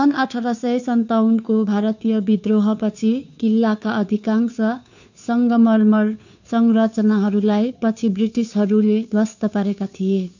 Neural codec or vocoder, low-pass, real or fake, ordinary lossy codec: codec, 16 kHz, 2 kbps, FunCodec, trained on Chinese and English, 25 frames a second; 7.2 kHz; fake; none